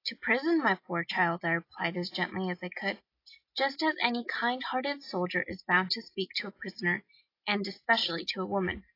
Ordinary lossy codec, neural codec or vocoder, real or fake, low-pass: AAC, 32 kbps; none; real; 5.4 kHz